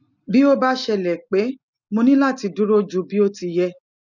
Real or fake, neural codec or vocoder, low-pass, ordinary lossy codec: real; none; 7.2 kHz; none